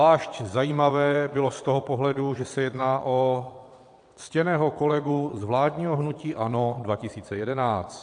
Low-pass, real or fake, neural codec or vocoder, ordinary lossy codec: 9.9 kHz; fake; vocoder, 22.05 kHz, 80 mel bands, WaveNeXt; AAC, 64 kbps